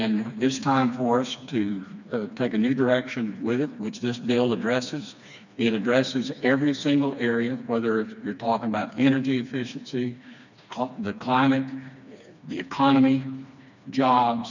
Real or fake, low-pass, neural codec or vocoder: fake; 7.2 kHz; codec, 16 kHz, 2 kbps, FreqCodec, smaller model